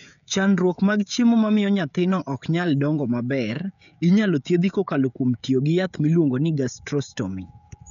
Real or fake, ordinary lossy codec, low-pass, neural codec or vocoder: fake; none; 7.2 kHz; codec, 16 kHz, 16 kbps, FreqCodec, smaller model